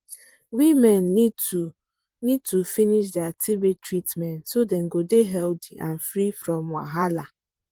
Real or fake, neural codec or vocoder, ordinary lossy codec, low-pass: fake; vocoder, 44.1 kHz, 128 mel bands, Pupu-Vocoder; Opus, 24 kbps; 19.8 kHz